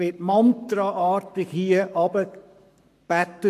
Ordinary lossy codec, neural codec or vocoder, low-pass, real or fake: MP3, 96 kbps; codec, 44.1 kHz, 7.8 kbps, Pupu-Codec; 14.4 kHz; fake